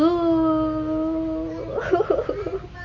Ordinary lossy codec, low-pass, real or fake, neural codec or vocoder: MP3, 32 kbps; 7.2 kHz; real; none